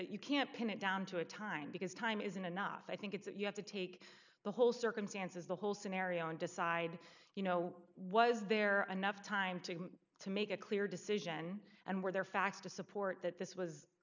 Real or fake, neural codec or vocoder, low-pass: real; none; 7.2 kHz